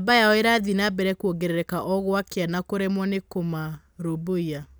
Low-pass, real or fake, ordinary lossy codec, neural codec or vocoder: none; real; none; none